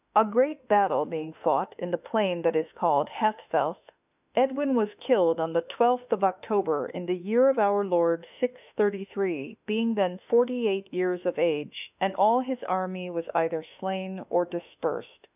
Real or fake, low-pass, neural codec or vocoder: fake; 3.6 kHz; autoencoder, 48 kHz, 32 numbers a frame, DAC-VAE, trained on Japanese speech